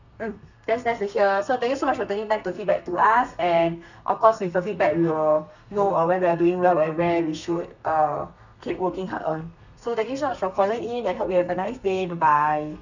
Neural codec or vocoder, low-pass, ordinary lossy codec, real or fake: codec, 32 kHz, 1.9 kbps, SNAC; 7.2 kHz; none; fake